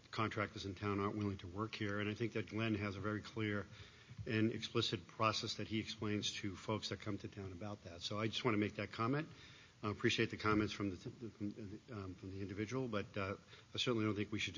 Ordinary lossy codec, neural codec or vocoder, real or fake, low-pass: MP3, 32 kbps; none; real; 7.2 kHz